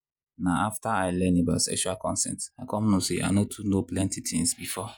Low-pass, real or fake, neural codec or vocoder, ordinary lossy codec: none; fake; vocoder, 48 kHz, 128 mel bands, Vocos; none